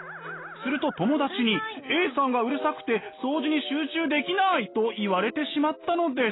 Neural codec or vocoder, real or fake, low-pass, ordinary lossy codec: none; real; 7.2 kHz; AAC, 16 kbps